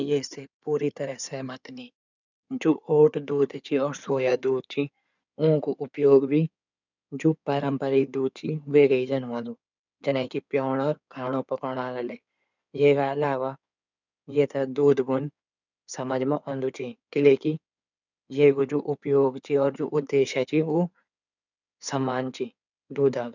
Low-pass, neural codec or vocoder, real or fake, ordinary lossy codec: 7.2 kHz; codec, 16 kHz in and 24 kHz out, 2.2 kbps, FireRedTTS-2 codec; fake; none